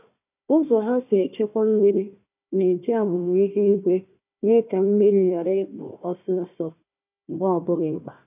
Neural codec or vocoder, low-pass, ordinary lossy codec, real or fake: codec, 16 kHz, 1 kbps, FunCodec, trained on Chinese and English, 50 frames a second; 3.6 kHz; none; fake